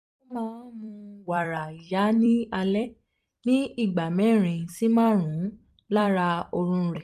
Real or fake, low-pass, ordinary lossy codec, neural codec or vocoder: fake; 14.4 kHz; none; vocoder, 44.1 kHz, 128 mel bands every 256 samples, BigVGAN v2